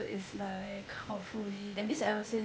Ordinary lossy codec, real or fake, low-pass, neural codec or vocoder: none; fake; none; codec, 16 kHz, 0.8 kbps, ZipCodec